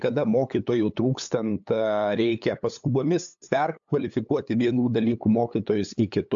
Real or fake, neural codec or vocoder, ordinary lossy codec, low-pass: fake; codec, 16 kHz, 8 kbps, FunCodec, trained on LibriTTS, 25 frames a second; AAC, 64 kbps; 7.2 kHz